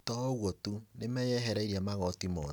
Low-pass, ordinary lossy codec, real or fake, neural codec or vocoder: none; none; real; none